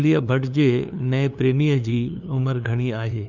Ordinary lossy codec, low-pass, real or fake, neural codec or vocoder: none; 7.2 kHz; fake; codec, 16 kHz, 2 kbps, FunCodec, trained on LibriTTS, 25 frames a second